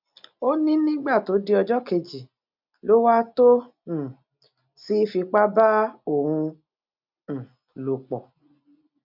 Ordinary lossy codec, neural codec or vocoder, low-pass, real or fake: MP3, 48 kbps; none; 5.4 kHz; real